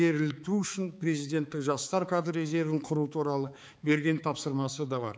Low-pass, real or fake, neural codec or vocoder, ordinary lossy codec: none; fake; codec, 16 kHz, 4 kbps, X-Codec, HuBERT features, trained on balanced general audio; none